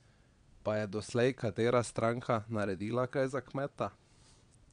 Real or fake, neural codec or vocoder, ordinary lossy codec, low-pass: fake; vocoder, 22.05 kHz, 80 mel bands, Vocos; MP3, 96 kbps; 9.9 kHz